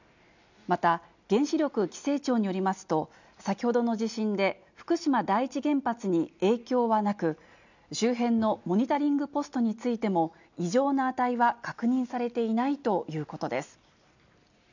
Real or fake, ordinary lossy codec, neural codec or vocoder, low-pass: real; none; none; 7.2 kHz